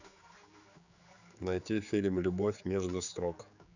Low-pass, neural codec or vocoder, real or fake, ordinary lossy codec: 7.2 kHz; codec, 16 kHz, 4 kbps, X-Codec, HuBERT features, trained on general audio; fake; none